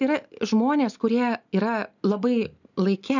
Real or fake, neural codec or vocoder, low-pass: real; none; 7.2 kHz